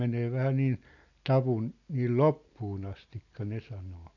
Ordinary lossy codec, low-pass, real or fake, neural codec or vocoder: none; 7.2 kHz; real; none